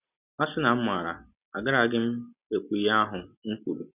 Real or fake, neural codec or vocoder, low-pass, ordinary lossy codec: real; none; 3.6 kHz; none